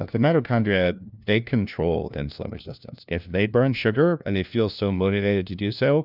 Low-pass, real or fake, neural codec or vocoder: 5.4 kHz; fake; codec, 16 kHz, 1 kbps, FunCodec, trained on LibriTTS, 50 frames a second